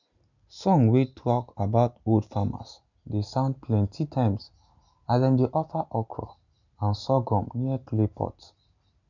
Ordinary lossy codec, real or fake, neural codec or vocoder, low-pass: none; real; none; 7.2 kHz